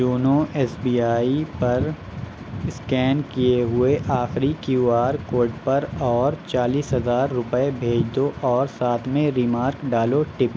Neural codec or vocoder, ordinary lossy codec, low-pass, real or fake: none; none; none; real